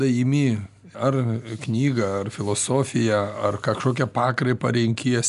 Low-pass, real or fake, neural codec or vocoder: 10.8 kHz; real; none